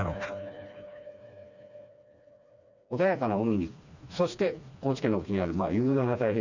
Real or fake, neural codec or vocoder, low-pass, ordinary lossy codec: fake; codec, 16 kHz, 2 kbps, FreqCodec, smaller model; 7.2 kHz; none